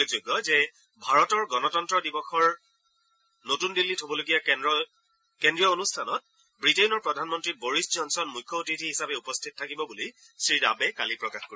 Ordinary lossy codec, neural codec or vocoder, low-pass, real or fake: none; none; none; real